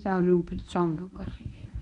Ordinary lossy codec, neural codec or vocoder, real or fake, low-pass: none; codec, 24 kHz, 0.9 kbps, WavTokenizer, medium speech release version 1; fake; 10.8 kHz